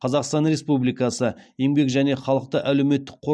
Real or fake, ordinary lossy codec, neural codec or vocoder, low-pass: real; none; none; none